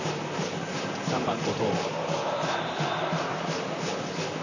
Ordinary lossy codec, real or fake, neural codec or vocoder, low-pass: none; fake; vocoder, 44.1 kHz, 128 mel bands, Pupu-Vocoder; 7.2 kHz